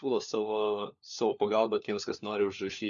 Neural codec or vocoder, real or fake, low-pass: codec, 16 kHz, 4 kbps, FunCodec, trained on LibriTTS, 50 frames a second; fake; 7.2 kHz